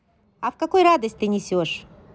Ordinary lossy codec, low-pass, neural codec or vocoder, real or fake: none; none; none; real